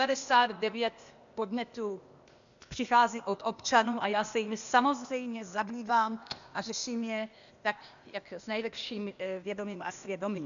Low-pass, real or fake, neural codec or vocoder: 7.2 kHz; fake; codec, 16 kHz, 0.8 kbps, ZipCodec